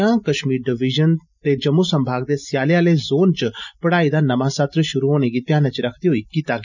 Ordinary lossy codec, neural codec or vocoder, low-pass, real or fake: none; none; 7.2 kHz; real